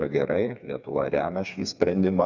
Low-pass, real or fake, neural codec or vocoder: 7.2 kHz; fake; codec, 16 kHz, 4 kbps, FreqCodec, smaller model